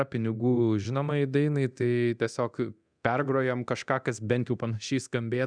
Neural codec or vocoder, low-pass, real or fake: codec, 24 kHz, 0.9 kbps, DualCodec; 9.9 kHz; fake